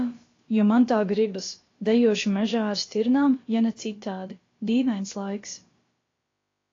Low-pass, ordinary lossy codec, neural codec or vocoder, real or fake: 7.2 kHz; AAC, 32 kbps; codec, 16 kHz, about 1 kbps, DyCAST, with the encoder's durations; fake